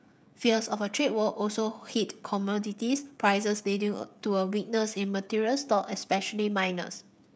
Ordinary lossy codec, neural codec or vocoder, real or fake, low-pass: none; codec, 16 kHz, 16 kbps, FreqCodec, smaller model; fake; none